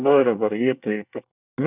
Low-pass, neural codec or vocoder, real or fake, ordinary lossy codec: 3.6 kHz; codec, 24 kHz, 1 kbps, SNAC; fake; none